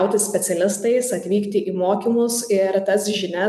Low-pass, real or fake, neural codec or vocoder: 14.4 kHz; real; none